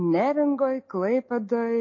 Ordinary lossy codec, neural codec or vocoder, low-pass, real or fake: MP3, 32 kbps; none; 7.2 kHz; real